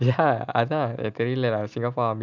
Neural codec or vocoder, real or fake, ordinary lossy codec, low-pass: none; real; none; 7.2 kHz